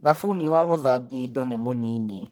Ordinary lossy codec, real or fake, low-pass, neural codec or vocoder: none; fake; none; codec, 44.1 kHz, 1.7 kbps, Pupu-Codec